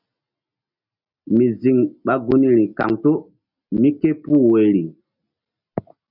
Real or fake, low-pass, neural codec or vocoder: real; 5.4 kHz; none